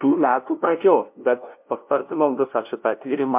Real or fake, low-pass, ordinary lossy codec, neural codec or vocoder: fake; 3.6 kHz; MP3, 32 kbps; codec, 16 kHz, 0.5 kbps, FunCodec, trained on LibriTTS, 25 frames a second